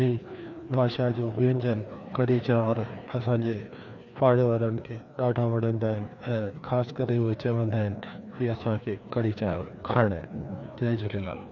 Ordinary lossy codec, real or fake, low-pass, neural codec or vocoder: none; fake; 7.2 kHz; codec, 16 kHz, 2 kbps, FreqCodec, larger model